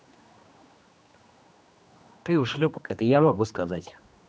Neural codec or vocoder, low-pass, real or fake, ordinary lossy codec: codec, 16 kHz, 2 kbps, X-Codec, HuBERT features, trained on general audio; none; fake; none